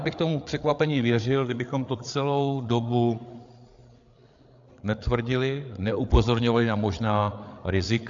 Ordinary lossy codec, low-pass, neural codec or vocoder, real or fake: AAC, 64 kbps; 7.2 kHz; codec, 16 kHz, 8 kbps, FreqCodec, larger model; fake